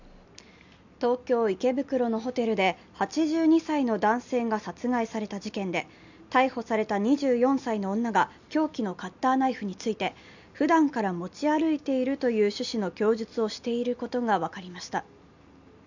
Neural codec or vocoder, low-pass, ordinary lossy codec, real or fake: none; 7.2 kHz; none; real